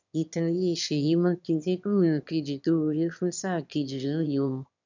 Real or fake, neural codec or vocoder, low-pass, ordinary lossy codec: fake; autoencoder, 22.05 kHz, a latent of 192 numbers a frame, VITS, trained on one speaker; 7.2 kHz; none